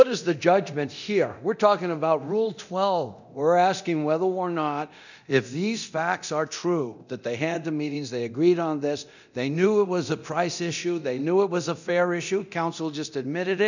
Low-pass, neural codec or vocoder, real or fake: 7.2 kHz; codec, 24 kHz, 0.9 kbps, DualCodec; fake